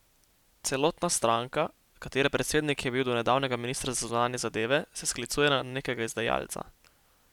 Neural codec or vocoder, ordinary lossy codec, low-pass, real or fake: none; none; 19.8 kHz; real